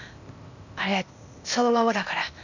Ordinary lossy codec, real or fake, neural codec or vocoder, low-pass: none; fake; codec, 16 kHz, 0.8 kbps, ZipCodec; 7.2 kHz